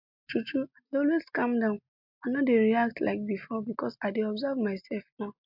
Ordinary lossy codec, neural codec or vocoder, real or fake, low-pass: MP3, 48 kbps; none; real; 5.4 kHz